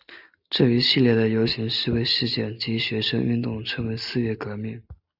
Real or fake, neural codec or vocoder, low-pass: real; none; 5.4 kHz